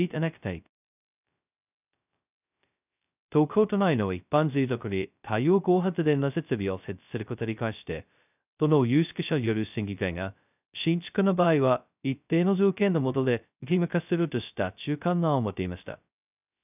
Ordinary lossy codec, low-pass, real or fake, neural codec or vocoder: none; 3.6 kHz; fake; codec, 16 kHz, 0.2 kbps, FocalCodec